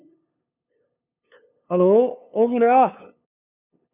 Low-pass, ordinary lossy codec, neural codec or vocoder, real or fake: 3.6 kHz; none; codec, 16 kHz, 2 kbps, FunCodec, trained on LibriTTS, 25 frames a second; fake